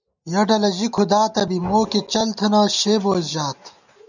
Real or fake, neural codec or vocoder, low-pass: real; none; 7.2 kHz